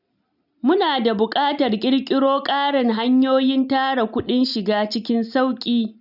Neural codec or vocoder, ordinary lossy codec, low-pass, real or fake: none; none; 5.4 kHz; real